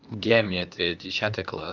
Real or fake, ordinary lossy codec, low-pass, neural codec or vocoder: fake; Opus, 24 kbps; 7.2 kHz; codec, 16 kHz, 0.8 kbps, ZipCodec